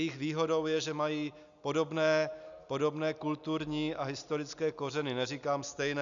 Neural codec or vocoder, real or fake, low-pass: none; real; 7.2 kHz